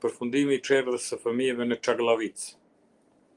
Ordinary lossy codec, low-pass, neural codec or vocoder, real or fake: Opus, 24 kbps; 10.8 kHz; none; real